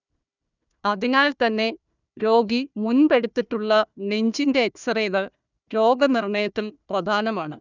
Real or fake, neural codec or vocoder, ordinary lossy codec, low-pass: fake; codec, 16 kHz, 1 kbps, FunCodec, trained on Chinese and English, 50 frames a second; none; 7.2 kHz